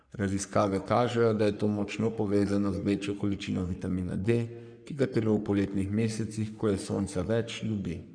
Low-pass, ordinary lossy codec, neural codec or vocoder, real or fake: 9.9 kHz; none; codec, 44.1 kHz, 3.4 kbps, Pupu-Codec; fake